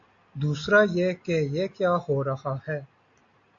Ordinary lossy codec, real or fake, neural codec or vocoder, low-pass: AAC, 48 kbps; real; none; 7.2 kHz